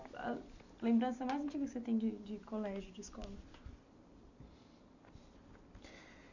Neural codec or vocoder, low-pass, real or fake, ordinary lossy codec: none; 7.2 kHz; real; none